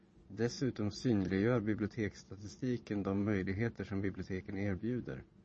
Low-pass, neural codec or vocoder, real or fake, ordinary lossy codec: 9.9 kHz; vocoder, 22.05 kHz, 80 mel bands, Vocos; fake; MP3, 32 kbps